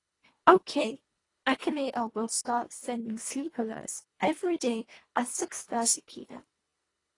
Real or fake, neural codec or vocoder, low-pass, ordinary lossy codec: fake; codec, 24 kHz, 1.5 kbps, HILCodec; 10.8 kHz; AAC, 32 kbps